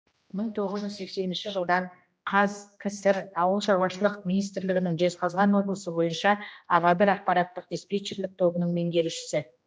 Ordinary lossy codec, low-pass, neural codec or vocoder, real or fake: none; none; codec, 16 kHz, 1 kbps, X-Codec, HuBERT features, trained on general audio; fake